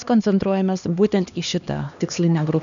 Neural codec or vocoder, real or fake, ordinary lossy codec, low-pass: codec, 16 kHz, 2 kbps, X-Codec, HuBERT features, trained on LibriSpeech; fake; MP3, 96 kbps; 7.2 kHz